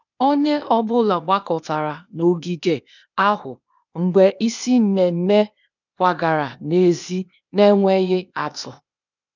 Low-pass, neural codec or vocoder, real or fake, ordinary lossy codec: 7.2 kHz; codec, 16 kHz, 0.8 kbps, ZipCodec; fake; none